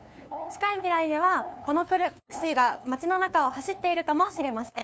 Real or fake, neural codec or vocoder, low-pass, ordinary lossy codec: fake; codec, 16 kHz, 2 kbps, FunCodec, trained on LibriTTS, 25 frames a second; none; none